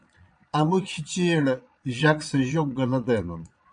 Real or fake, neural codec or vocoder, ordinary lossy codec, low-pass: fake; vocoder, 22.05 kHz, 80 mel bands, Vocos; MP3, 96 kbps; 9.9 kHz